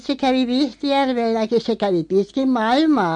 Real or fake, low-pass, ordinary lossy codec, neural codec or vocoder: real; 9.9 kHz; MP3, 48 kbps; none